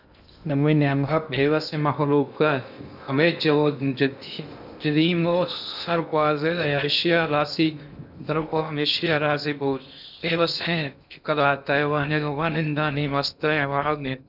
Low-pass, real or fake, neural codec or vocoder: 5.4 kHz; fake; codec, 16 kHz in and 24 kHz out, 0.6 kbps, FocalCodec, streaming, 2048 codes